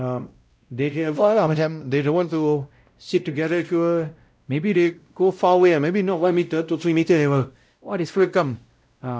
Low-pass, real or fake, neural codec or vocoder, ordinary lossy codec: none; fake; codec, 16 kHz, 0.5 kbps, X-Codec, WavLM features, trained on Multilingual LibriSpeech; none